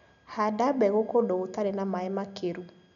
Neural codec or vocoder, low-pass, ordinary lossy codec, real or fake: none; 7.2 kHz; none; real